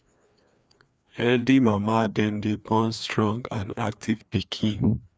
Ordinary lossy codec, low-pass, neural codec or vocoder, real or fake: none; none; codec, 16 kHz, 2 kbps, FreqCodec, larger model; fake